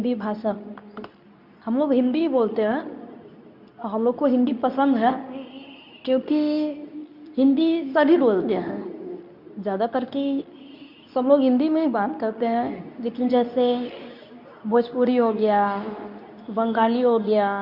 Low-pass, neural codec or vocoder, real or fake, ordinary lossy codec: 5.4 kHz; codec, 24 kHz, 0.9 kbps, WavTokenizer, medium speech release version 2; fake; none